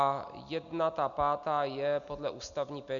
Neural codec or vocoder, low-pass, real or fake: none; 7.2 kHz; real